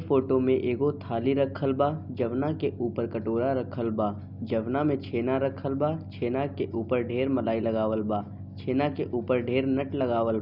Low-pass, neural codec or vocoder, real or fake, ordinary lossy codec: 5.4 kHz; none; real; none